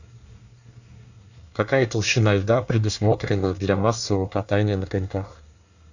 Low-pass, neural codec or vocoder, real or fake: 7.2 kHz; codec, 24 kHz, 1 kbps, SNAC; fake